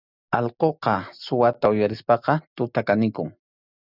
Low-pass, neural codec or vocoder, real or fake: 5.4 kHz; none; real